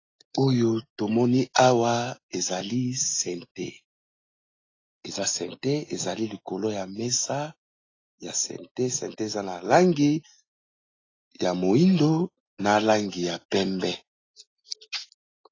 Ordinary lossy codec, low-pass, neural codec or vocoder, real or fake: AAC, 32 kbps; 7.2 kHz; none; real